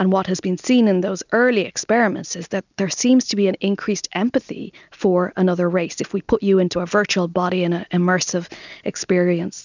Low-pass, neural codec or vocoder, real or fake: 7.2 kHz; none; real